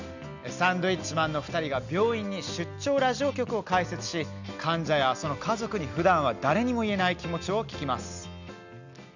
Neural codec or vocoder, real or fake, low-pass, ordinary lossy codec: none; real; 7.2 kHz; none